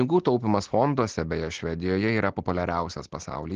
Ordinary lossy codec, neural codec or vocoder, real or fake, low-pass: Opus, 16 kbps; none; real; 7.2 kHz